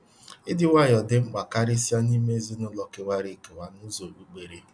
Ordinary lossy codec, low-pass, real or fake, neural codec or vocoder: none; 9.9 kHz; real; none